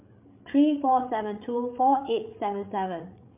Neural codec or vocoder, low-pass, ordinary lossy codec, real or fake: codec, 16 kHz, 8 kbps, FreqCodec, larger model; 3.6 kHz; MP3, 32 kbps; fake